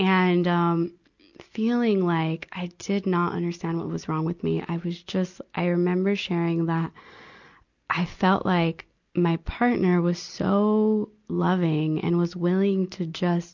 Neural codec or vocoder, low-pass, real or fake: none; 7.2 kHz; real